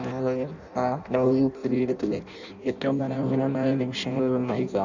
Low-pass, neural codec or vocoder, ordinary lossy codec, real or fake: 7.2 kHz; codec, 16 kHz in and 24 kHz out, 0.6 kbps, FireRedTTS-2 codec; none; fake